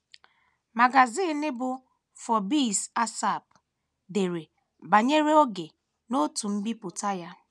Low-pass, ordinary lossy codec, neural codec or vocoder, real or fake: none; none; none; real